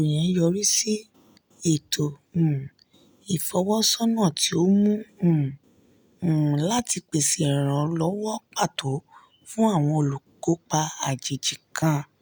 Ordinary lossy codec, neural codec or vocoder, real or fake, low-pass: none; none; real; none